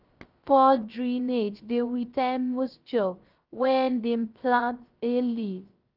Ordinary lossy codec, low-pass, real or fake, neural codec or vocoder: Opus, 24 kbps; 5.4 kHz; fake; codec, 16 kHz, 0.3 kbps, FocalCodec